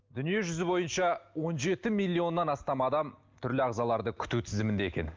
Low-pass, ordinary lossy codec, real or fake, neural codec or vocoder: 7.2 kHz; Opus, 32 kbps; real; none